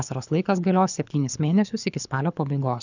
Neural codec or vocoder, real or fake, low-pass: codec, 24 kHz, 6 kbps, HILCodec; fake; 7.2 kHz